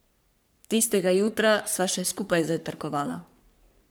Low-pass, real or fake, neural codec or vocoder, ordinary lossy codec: none; fake; codec, 44.1 kHz, 3.4 kbps, Pupu-Codec; none